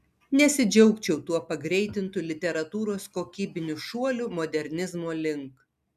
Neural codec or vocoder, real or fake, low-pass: none; real; 14.4 kHz